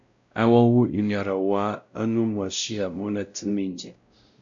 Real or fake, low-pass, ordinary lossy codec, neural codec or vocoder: fake; 7.2 kHz; MP3, 64 kbps; codec, 16 kHz, 0.5 kbps, X-Codec, WavLM features, trained on Multilingual LibriSpeech